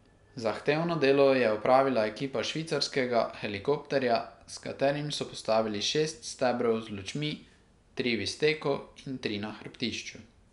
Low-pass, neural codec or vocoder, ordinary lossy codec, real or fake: 10.8 kHz; none; none; real